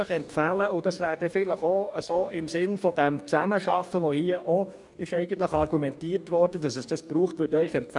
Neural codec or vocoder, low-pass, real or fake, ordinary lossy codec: codec, 44.1 kHz, 2.6 kbps, DAC; 10.8 kHz; fake; none